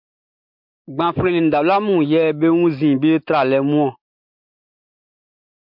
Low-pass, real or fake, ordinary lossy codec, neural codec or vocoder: 5.4 kHz; real; MP3, 48 kbps; none